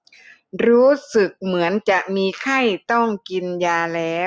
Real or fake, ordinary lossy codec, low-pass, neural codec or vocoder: real; none; none; none